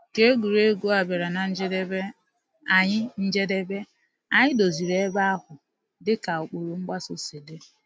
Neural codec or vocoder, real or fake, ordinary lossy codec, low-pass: none; real; none; none